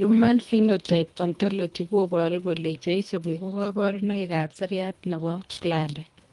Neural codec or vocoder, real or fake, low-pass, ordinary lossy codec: codec, 24 kHz, 1.5 kbps, HILCodec; fake; 10.8 kHz; Opus, 32 kbps